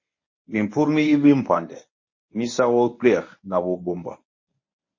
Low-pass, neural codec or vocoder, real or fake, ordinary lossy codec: 7.2 kHz; codec, 24 kHz, 0.9 kbps, WavTokenizer, medium speech release version 1; fake; MP3, 32 kbps